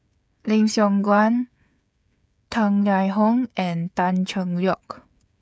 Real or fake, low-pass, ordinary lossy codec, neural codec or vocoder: fake; none; none; codec, 16 kHz, 16 kbps, FreqCodec, smaller model